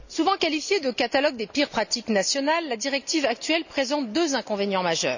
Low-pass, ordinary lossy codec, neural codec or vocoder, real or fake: 7.2 kHz; none; none; real